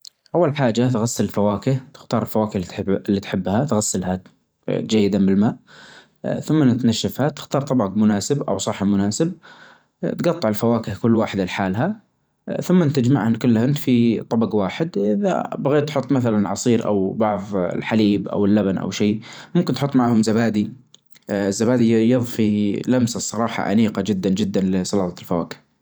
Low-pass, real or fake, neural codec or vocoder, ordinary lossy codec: none; fake; vocoder, 44.1 kHz, 128 mel bands every 512 samples, BigVGAN v2; none